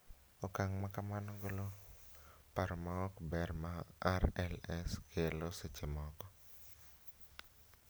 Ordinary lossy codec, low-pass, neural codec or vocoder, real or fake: none; none; none; real